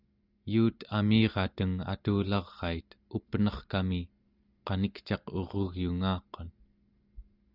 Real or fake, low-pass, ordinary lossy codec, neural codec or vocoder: real; 5.4 kHz; Opus, 64 kbps; none